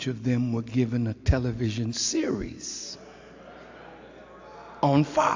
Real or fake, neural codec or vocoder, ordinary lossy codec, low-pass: real; none; AAC, 48 kbps; 7.2 kHz